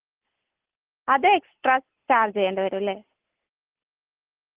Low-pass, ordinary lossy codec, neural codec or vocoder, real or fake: 3.6 kHz; Opus, 16 kbps; none; real